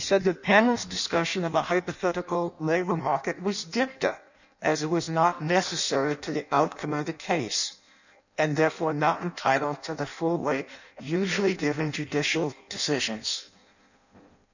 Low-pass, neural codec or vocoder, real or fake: 7.2 kHz; codec, 16 kHz in and 24 kHz out, 0.6 kbps, FireRedTTS-2 codec; fake